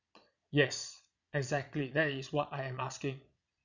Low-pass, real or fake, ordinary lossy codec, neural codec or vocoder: 7.2 kHz; fake; none; vocoder, 22.05 kHz, 80 mel bands, Vocos